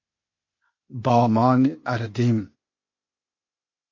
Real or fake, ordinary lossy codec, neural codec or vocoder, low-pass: fake; MP3, 32 kbps; codec, 16 kHz, 0.8 kbps, ZipCodec; 7.2 kHz